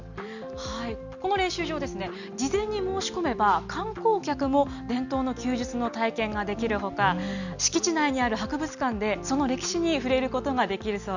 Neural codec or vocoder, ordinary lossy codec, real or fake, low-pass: none; none; real; 7.2 kHz